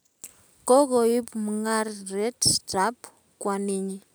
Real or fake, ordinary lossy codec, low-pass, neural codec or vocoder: real; none; none; none